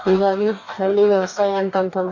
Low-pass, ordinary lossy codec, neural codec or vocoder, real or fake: 7.2 kHz; AAC, 48 kbps; codec, 44.1 kHz, 2.6 kbps, DAC; fake